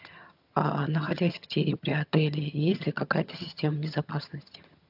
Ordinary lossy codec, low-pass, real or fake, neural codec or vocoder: AAC, 48 kbps; 5.4 kHz; fake; vocoder, 22.05 kHz, 80 mel bands, HiFi-GAN